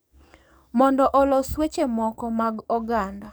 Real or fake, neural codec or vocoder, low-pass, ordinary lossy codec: fake; codec, 44.1 kHz, 7.8 kbps, DAC; none; none